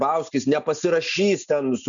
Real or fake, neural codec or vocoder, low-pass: real; none; 7.2 kHz